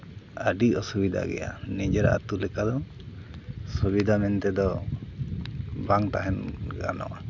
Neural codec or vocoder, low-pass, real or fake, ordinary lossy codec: none; 7.2 kHz; real; none